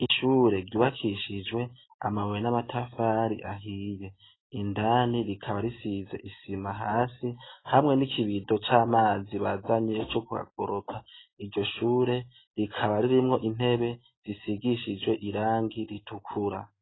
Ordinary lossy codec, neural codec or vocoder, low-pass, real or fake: AAC, 16 kbps; none; 7.2 kHz; real